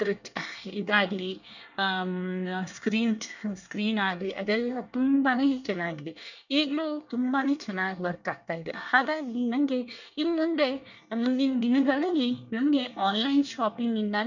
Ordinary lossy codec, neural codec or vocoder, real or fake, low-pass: none; codec, 24 kHz, 1 kbps, SNAC; fake; 7.2 kHz